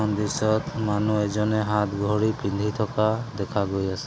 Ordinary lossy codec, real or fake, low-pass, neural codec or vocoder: none; real; none; none